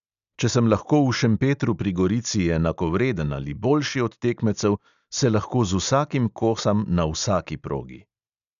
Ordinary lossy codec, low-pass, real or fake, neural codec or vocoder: MP3, 96 kbps; 7.2 kHz; real; none